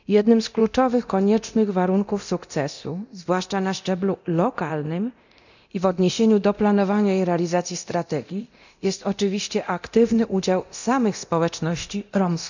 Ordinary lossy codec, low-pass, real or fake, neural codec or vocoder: none; 7.2 kHz; fake; codec, 24 kHz, 0.9 kbps, DualCodec